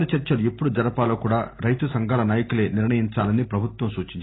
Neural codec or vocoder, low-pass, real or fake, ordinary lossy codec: none; 7.2 kHz; real; AAC, 16 kbps